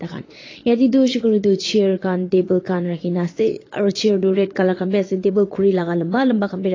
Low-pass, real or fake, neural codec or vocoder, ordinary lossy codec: 7.2 kHz; fake; vocoder, 22.05 kHz, 80 mel bands, Vocos; AAC, 32 kbps